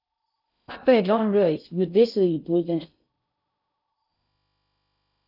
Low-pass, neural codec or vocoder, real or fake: 5.4 kHz; codec, 16 kHz in and 24 kHz out, 0.6 kbps, FocalCodec, streaming, 2048 codes; fake